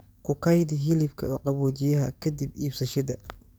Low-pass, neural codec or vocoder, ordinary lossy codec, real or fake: none; codec, 44.1 kHz, 7.8 kbps, DAC; none; fake